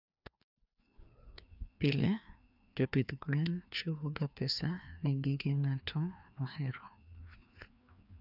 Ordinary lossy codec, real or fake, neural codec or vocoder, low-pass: none; fake; codec, 16 kHz, 2 kbps, FreqCodec, larger model; 5.4 kHz